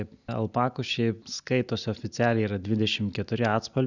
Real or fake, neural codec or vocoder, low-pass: real; none; 7.2 kHz